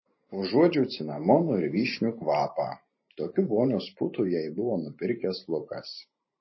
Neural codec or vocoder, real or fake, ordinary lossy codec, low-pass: vocoder, 44.1 kHz, 128 mel bands every 512 samples, BigVGAN v2; fake; MP3, 24 kbps; 7.2 kHz